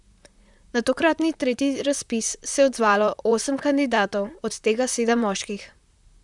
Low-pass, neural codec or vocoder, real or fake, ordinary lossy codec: 10.8 kHz; vocoder, 44.1 kHz, 128 mel bands, Pupu-Vocoder; fake; none